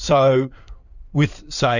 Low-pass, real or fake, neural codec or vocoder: 7.2 kHz; fake; codec, 16 kHz in and 24 kHz out, 2.2 kbps, FireRedTTS-2 codec